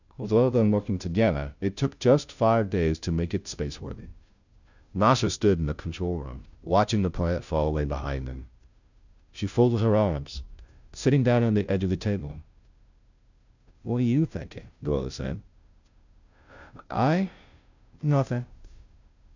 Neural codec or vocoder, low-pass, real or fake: codec, 16 kHz, 0.5 kbps, FunCodec, trained on Chinese and English, 25 frames a second; 7.2 kHz; fake